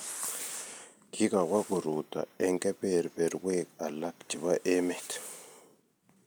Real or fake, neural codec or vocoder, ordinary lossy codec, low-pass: real; none; none; none